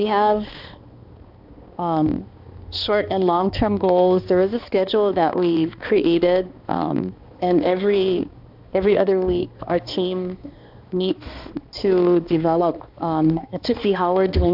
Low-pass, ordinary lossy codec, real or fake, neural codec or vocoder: 5.4 kHz; AAC, 48 kbps; fake; codec, 16 kHz, 2 kbps, X-Codec, HuBERT features, trained on balanced general audio